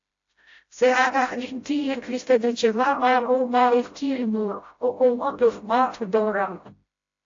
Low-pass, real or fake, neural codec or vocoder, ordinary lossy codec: 7.2 kHz; fake; codec, 16 kHz, 0.5 kbps, FreqCodec, smaller model; AAC, 48 kbps